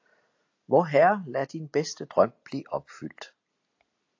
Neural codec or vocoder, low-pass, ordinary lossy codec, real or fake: none; 7.2 kHz; AAC, 48 kbps; real